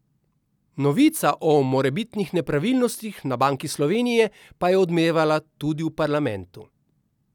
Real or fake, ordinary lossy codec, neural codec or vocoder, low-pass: real; none; none; 19.8 kHz